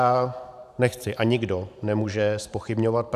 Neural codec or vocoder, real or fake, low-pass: vocoder, 44.1 kHz, 128 mel bands every 512 samples, BigVGAN v2; fake; 14.4 kHz